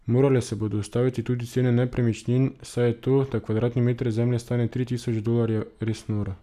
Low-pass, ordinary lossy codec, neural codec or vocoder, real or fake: 14.4 kHz; none; none; real